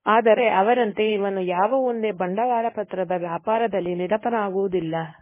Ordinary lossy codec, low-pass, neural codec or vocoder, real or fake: MP3, 16 kbps; 3.6 kHz; codec, 24 kHz, 0.9 kbps, WavTokenizer, medium speech release version 1; fake